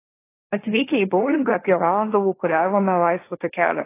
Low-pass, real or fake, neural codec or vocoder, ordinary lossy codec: 3.6 kHz; fake; codec, 16 kHz, 1.1 kbps, Voila-Tokenizer; AAC, 24 kbps